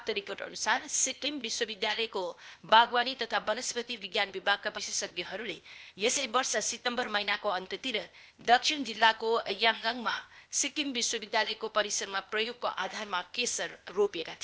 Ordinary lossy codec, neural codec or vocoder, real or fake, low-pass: none; codec, 16 kHz, 0.8 kbps, ZipCodec; fake; none